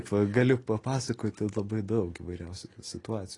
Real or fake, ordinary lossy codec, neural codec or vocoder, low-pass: real; AAC, 32 kbps; none; 10.8 kHz